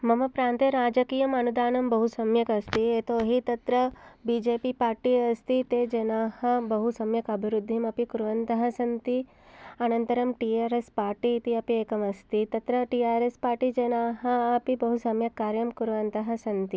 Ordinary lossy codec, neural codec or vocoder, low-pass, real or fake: none; none; none; real